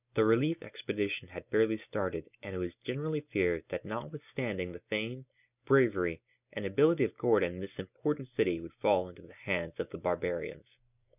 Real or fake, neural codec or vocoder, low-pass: real; none; 3.6 kHz